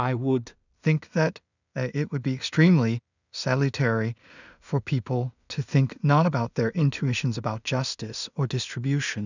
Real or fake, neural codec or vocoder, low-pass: fake; codec, 16 kHz in and 24 kHz out, 0.4 kbps, LongCat-Audio-Codec, two codebook decoder; 7.2 kHz